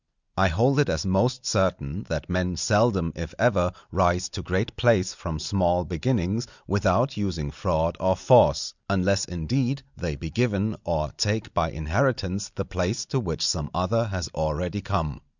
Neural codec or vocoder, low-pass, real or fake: none; 7.2 kHz; real